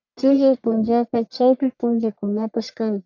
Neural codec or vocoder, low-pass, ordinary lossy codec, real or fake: codec, 44.1 kHz, 1.7 kbps, Pupu-Codec; 7.2 kHz; AAC, 48 kbps; fake